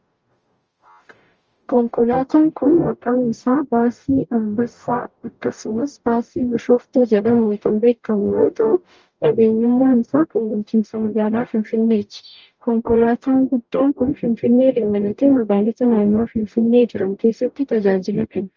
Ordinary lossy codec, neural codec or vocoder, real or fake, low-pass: Opus, 24 kbps; codec, 44.1 kHz, 0.9 kbps, DAC; fake; 7.2 kHz